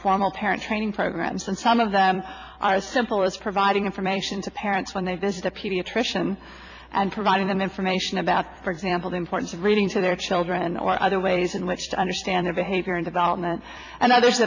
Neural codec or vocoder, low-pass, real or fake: vocoder, 44.1 kHz, 128 mel bands every 256 samples, BigVGAN v2; 7.2 kHz; fake